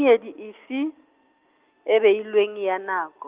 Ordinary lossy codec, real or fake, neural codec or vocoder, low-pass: Opus, 32 kbps; real; none; 3.6 kHz